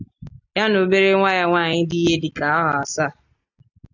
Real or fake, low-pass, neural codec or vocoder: real; 7.2 kHz; none